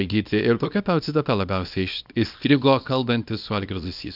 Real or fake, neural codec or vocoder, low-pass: fake; codec, 24 kHz, 0.9 kbps, WavTokenizer, small release; 5.4 kHz